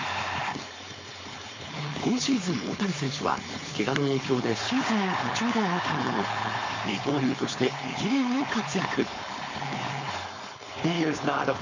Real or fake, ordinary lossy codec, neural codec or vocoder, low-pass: fake; MP3, 48 kbps; codec, 16 kHz, 4.8 kbps, FACodec; 7.2 kHz